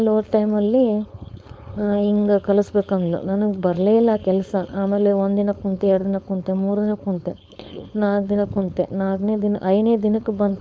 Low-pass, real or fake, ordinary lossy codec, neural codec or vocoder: none; fake; none; codec, 16 kHz, 4.8 kbps, FACodec